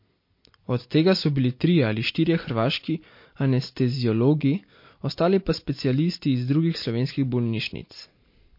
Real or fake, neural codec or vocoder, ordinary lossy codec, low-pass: real; none; MP3, 32 kbps; 5.4 kHz